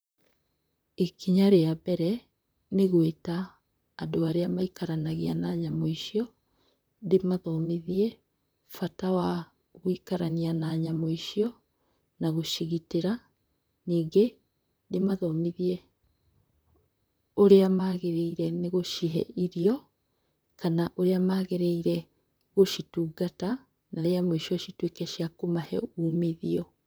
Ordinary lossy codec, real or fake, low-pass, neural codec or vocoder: none; fake; none; vocoder, 44.1 kHz, 128 mel bands, Pupu-Vocoder